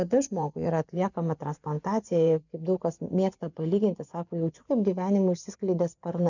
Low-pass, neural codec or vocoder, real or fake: 7.2 kHz; vocoder, 24 kHz, 100 mel bands, Vocos; fake